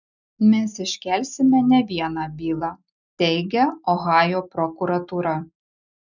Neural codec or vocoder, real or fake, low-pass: none; real; 7.2 kHz